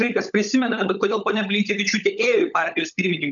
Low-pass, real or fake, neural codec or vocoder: 7.2 kHz; fake; codec, 16 kHz, 16 kbps, FunCodec, trained on LibriTTS, 50 frames a second